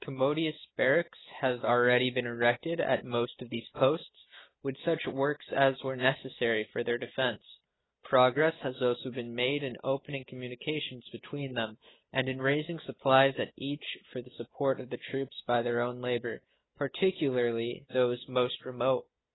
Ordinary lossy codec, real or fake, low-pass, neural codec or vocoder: AAC, 16 kbps; real; 7.2 kHz; none